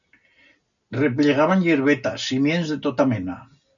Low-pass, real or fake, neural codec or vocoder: 7.2 kHz; real; none